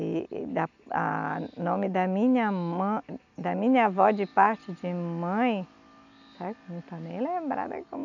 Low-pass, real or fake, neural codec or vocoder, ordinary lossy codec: 7.2 kHz; real; none; none